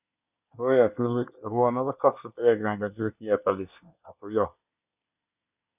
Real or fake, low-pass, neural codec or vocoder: fake; 3.6 kHz; codec, 24 kHz, 1 kbps, SNAC